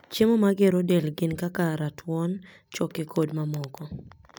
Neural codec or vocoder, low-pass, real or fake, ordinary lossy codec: none; none; real; none